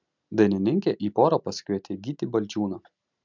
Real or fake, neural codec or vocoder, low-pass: real; none; 7.2 kHz